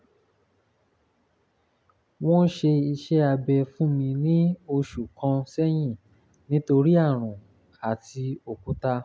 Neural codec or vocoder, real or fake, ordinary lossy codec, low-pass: none; real; none; none